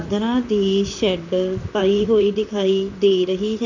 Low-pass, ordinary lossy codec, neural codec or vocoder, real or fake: 7.2 kHz; none; vocoder, 44.1 kHz, 128 mel bands, Pupu-Vocoder; fake